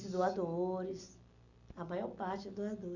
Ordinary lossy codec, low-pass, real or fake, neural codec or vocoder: none; 7.2 kHz; real; none